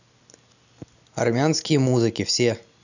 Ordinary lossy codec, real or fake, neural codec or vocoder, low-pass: none; real; none; 7.2 kHz